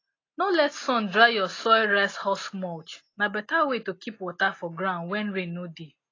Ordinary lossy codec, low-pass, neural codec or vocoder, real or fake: AAC, 32 kbps; 7.2 kHz; none; real